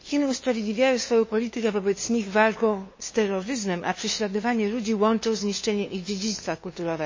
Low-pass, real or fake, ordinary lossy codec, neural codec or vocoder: 7.2 kHz; fake; MP3, 32 kbps; codec, 16 kHz, 2 kbps, FunCodec, trained on LibriTTS, 25 frames a second